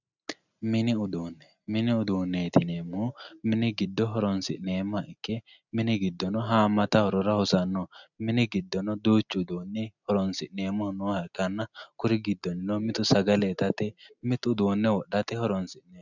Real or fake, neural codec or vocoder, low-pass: real; none; 7.2 kHz